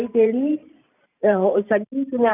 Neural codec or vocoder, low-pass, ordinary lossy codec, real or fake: vocoder, 44.1 kHz, 128 mel bands every 512 samples, BigVGAN v2; 3.6 kHz; none; fake